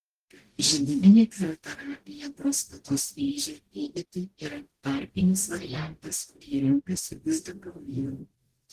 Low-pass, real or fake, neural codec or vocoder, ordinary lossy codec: 14.4 kHz; fake; codec, 44.1 kHz, 0.9 kbps, DAC; Opus, 16 kbps